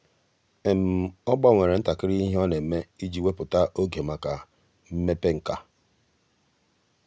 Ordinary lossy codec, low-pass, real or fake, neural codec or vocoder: none; none; real; none